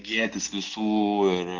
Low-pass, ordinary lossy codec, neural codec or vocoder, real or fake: 7.2 kHz; Opus, 16 kbps; none; real